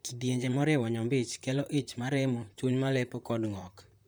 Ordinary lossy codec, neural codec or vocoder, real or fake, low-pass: none; vocoder, 44.1 kHz, 128 mel bands, Pupu-Vocoder; fake; none